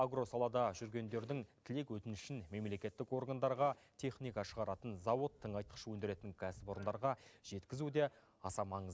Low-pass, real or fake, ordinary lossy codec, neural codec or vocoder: none; real; none; none